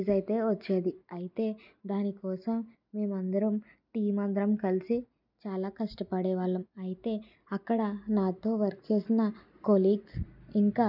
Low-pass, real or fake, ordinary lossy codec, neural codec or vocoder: 5.4 kHz; real; none; none